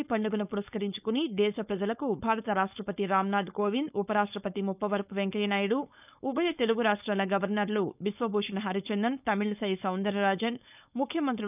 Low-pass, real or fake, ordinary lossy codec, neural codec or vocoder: 3.6 kHz; fake; none; codec, 16 kHz, 4.8 kbps, FACodec